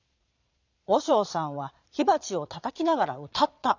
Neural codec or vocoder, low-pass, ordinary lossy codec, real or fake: vocoder, 22.05 kHz, 80 mel bands, Vocos; 7.2 kHz; none; fake